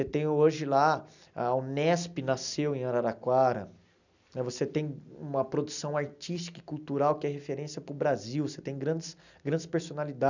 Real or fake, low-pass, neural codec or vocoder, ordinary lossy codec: real; 7.2 kHz; none; none